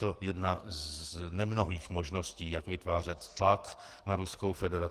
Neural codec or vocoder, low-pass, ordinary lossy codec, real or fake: codec, 44.1 kHz, 2.6 kbps, SNAC; 14.4 kHz; Opus, 24 kbps; fake